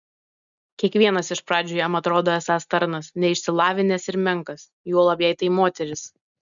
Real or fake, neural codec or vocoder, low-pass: real; none; 7.2 kHz